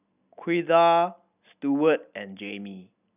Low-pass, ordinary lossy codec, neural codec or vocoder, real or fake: 3.6 kHz; none; none; real